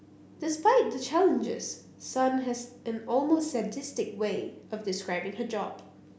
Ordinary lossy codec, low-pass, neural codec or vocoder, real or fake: none; none; none; real